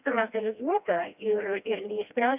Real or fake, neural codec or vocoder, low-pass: fake; codec, 16 kHz, 1 kbps, FreqCodec, smaller model; 3.6 kHz